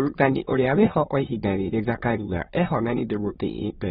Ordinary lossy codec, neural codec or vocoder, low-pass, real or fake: AAC, 16 kbps; autoencoder, 22.05 kHz, a latent of 192 numbers a frame, VITS, trained on many speakers; 9.9 kHz; fake